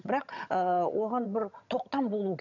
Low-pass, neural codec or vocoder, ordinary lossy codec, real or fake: 7.2 kHz; vocoder, 22.05 kHz, 80 mel bands, HiFi-GAN; none; fake